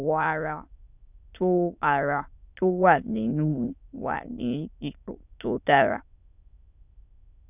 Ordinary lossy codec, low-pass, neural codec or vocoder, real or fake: none; 3.6 kHz; autoencoder, 22.05 kHz, a latent of 192 numbers a frame, VITS, trained on many speakers; fake